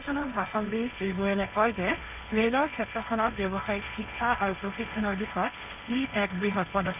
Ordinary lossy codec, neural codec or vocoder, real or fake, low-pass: none; codec, 16 kHz, 1.1 kbps, Voila-Tokenizer; fake; 3.6 kHz